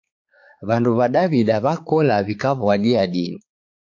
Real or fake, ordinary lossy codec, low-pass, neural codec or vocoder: fake; AAC, 48 kbps; 7.2 kHz; codec, 16 kHz, 4 kbps, X-Codec, HuBERT features, trained on balanced general audio